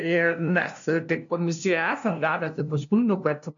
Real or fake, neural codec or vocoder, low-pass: fake; codec, 16 kHz, 0.5 kbps, FunCodec, trained on LibriTTS, 25 frames a second; 7.2 kHz